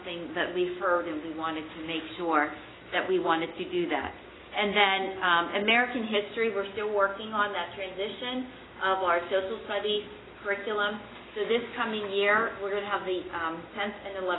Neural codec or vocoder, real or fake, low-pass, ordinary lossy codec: none; real; 7.2 kHz; AAC, 16 kbps